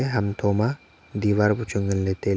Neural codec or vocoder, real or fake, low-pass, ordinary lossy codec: none; real; none; none